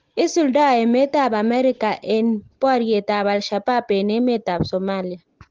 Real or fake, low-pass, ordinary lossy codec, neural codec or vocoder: real; 7.2 kHz; Opus, 16 kbps; none